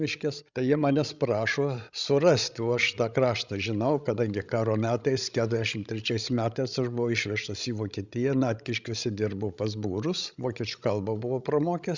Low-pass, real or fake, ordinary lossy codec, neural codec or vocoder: 7.2 kHz; fake; Opus, 64 kbps; codec, 16 kHz, 16 kbps, FreqCodec, larger model